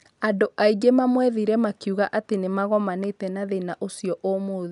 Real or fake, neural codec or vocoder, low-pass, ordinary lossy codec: real; none; 10.8 kHz; none